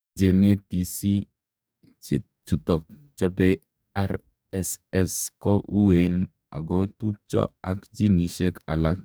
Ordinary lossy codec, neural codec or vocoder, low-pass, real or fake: none; codec, 44.1 kHz, 2.6 kbps, DAC; none; fake